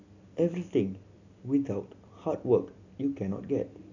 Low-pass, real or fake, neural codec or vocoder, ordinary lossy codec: 7.2 kHz; real; none; none